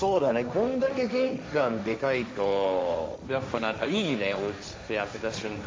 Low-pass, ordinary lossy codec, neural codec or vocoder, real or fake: none; none; codec, 16 kHz, 1.1 kbps, Voila-Tokenizer; fake